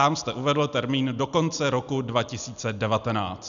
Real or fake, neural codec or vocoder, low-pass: real; none; 7.2 kHz